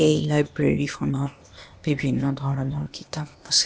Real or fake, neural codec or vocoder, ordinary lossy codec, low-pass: fake; codec, 16 kHz, 0.8 kbps, ZipCodec; none; none